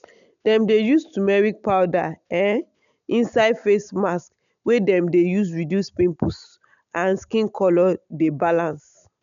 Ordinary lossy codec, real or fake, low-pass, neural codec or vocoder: none; real; 7.2 kHz; none